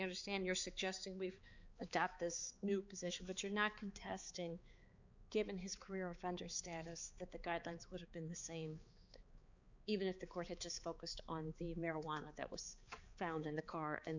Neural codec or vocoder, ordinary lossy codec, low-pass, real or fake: codec, 16 kHz, 4 kbps, X-Codec, HuBERT features, trained on balanced general audio; Opus, 64 kbps; 7.2 kHz; fake